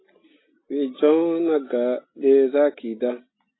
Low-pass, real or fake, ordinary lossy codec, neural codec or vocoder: 7.2 kHz; real; AAC, 16 kbps; none